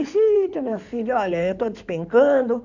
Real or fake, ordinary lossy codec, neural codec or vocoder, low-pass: fake; none; codec, 16 kHz in and 24 kHz out, 2.2 kbps, FireRedTTS-2 codec; 7.2 kHz